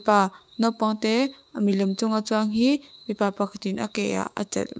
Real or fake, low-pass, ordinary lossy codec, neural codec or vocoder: fake; none; none; codec, 16 kHz, 6 kbps, DAC